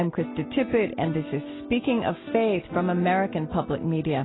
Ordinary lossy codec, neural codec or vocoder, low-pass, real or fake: AAC, 16 kbps; none; 7.2 kHz; real